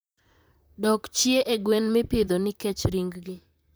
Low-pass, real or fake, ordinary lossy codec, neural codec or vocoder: none; fake; none; vocoder, 44.1 kHz, 128 mel bands, Pupu-Vocoder